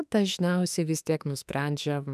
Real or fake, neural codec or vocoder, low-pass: fake; autoencoder, 48 kHz, 32 numbers a frame, DAC-VAE, trained on Japanese speech; 14.4 kHz